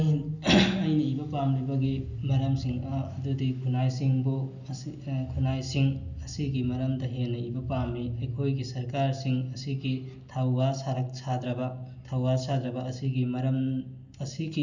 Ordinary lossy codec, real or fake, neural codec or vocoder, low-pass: none; real; none; 7.2 kHz